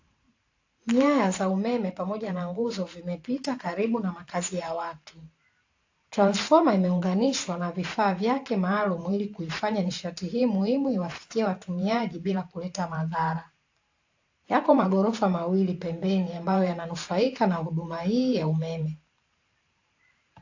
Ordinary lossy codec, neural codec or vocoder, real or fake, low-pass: AAC, 48 kbps; vocoder, 44.1 kHz, 128 mel bands every 512 samples, BigVGAN v2; fake; 7.2 kHz